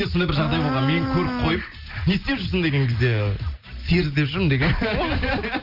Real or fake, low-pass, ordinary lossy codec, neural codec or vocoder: real; 5.4 kHz; Opus, 32 kbps; none